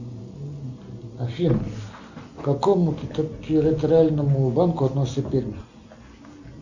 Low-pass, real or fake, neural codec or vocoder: 7.2 kHz; real; none